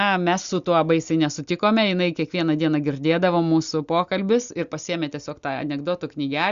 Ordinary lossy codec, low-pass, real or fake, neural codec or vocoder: Opus, 64 kbps; 7.2 kHz; real; none